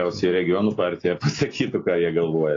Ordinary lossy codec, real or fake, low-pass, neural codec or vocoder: AAC, 32 kbps; real; 7.2 kHz; none